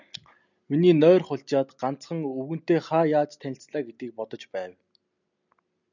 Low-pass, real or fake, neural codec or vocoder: 7.2 kHz; real; none